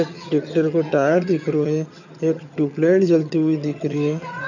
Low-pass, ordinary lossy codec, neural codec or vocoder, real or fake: 7.2 kHz; none; vocoder, 22.05 kHz, 80 mel bands, HiFi-GAN; fake